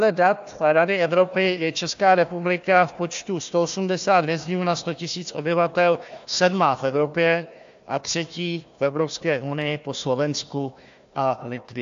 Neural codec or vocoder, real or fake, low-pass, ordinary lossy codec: codec, 16 kHz, 1 kbps, FunCodec, trained on Chinese and English, 50 frames a second; fake; 7.2 kHz; AAC, 64 kbps